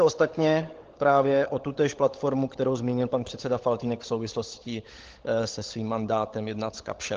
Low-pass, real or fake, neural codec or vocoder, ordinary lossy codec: 7.2 kHz; fake; codec, 16 kHz, 16 kbps, FunCodec, trained on LibriTTS, 50 frames a second; Opus, 16 kbps